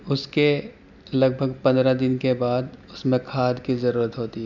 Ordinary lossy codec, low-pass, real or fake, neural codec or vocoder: none; 7.2 kHz; real; none